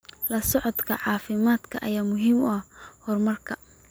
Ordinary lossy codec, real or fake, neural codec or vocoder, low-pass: none; real; none; none